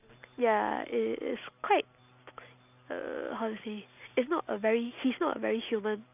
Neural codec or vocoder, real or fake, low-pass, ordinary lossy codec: none; real; 3.6 kHz; none